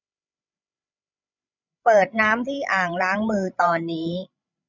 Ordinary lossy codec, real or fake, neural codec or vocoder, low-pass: none; fake; codec, 16 kHz, 16 kbps, FreqCodec, larger model; 7.2 kHz